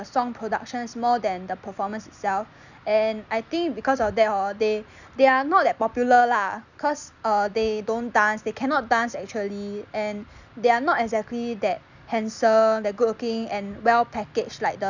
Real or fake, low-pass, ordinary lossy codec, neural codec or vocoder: real; 7.2 kHz; none; none